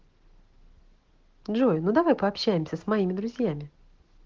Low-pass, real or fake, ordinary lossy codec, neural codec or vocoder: 7.2 kHz; real; Opus, 16 kbps; none